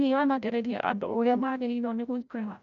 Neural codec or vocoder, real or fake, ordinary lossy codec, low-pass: codec, 16 kHz, 0.5 kbps, FreqCodec, larger model; fake; none; 7.2 kHz